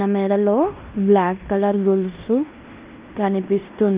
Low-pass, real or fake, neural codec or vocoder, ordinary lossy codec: 3.6 kHz; fake; codec, 16 kHz in and 24 kHz out, 0.9 kbps, LongCat-Audio-Codec, fine tuned four codebook decoder; Opus, 24 kbps